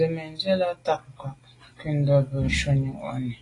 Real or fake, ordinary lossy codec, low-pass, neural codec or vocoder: real; AAC, 32 kbps; 10.8 kHz; none